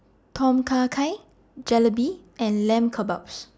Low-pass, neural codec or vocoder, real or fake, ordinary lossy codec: none; none; real; none